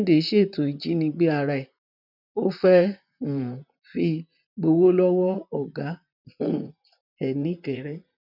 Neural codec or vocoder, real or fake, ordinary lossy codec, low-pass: vocoder, 44.1 kHz, 128 mel bands, Pupu-Vocoder; fake; none; 5.4 kHz